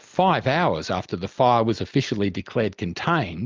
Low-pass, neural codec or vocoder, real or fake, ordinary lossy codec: 7.2 kHz; none; real; Opus, 32 kbps